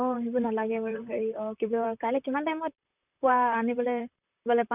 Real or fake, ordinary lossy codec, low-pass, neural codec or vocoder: fake; none; 3.6 kHz; vocoder, 44.1 kHz, 128 mel bands, Pupu-Vocoder